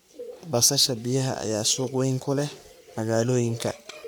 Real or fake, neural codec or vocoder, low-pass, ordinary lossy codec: fake; codec, 44.1 kHz, 3.4 kbps, Pupu-Codec; none; none